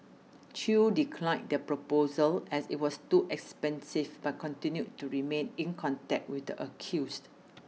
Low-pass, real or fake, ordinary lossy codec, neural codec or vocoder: none; real; none; none